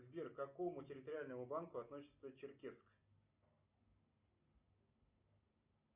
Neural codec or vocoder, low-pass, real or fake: none; 3.6 kHz; real